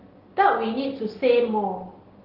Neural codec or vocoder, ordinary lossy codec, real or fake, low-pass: none; Opus, 16 kbps; real; 5.4 kHz